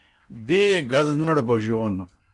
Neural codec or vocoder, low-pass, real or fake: codec, 16 kHz in and 24 kHz out, 0.8 kbps, FocalCodec, streaming, 65536 codes; 10.8 kHz; fake